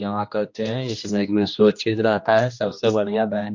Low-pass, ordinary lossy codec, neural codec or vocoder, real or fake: 7.2 kHz; MP3, 48 kbps; codec, 16 kHz, 1 kbps, X-Codec, HuBERT features, trained on general audio; fake